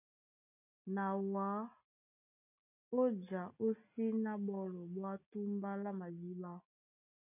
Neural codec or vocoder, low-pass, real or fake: none; 3.6 kHz; real